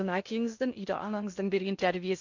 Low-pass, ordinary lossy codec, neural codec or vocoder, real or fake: 7.2 kHz; none; codec, 16 kHz in and 24 kHz out, 0.6 kbps, FocalCodec, streaming, 2048 codes; fake